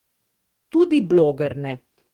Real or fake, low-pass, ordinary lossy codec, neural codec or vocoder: fake; 19.8 kHz; Opus, 24 kbps; codec, 44.1 kHz, 2.6 kbps, DAC